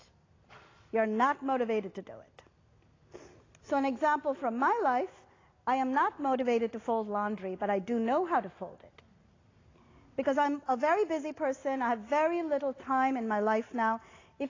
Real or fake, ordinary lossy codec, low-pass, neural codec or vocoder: real; AAC, 32 kbps; 7.2 kHz; none